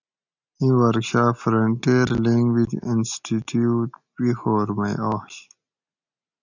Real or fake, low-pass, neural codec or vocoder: real; 7.2 kHz; none